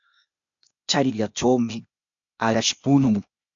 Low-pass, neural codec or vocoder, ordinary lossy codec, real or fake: 7.2 kHz; codec, 16 kHz, 0.8 kbps, ZipCodec; MP3, 64 kbps; fake